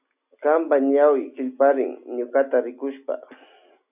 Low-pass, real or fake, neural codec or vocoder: 3.6 kHz; real; none